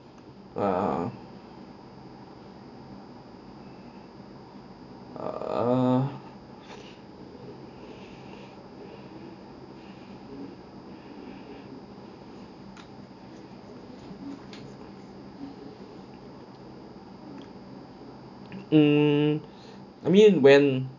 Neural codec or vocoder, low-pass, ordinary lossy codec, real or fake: none; 7.2 kHz; none; real